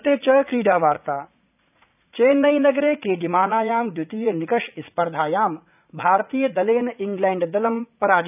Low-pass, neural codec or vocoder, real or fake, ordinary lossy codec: 3.6 kHz; vocoder, 44.1 kHz, 80 mel bands, Vocos; fake; none